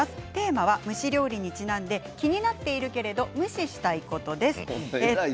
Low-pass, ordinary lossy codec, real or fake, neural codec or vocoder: none; none; real; none